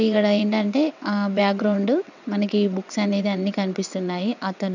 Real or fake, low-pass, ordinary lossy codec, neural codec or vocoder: fake; 7.2 kHz; none; vocoder, 22.05 kHz, 80 mel bands, WaveNeXt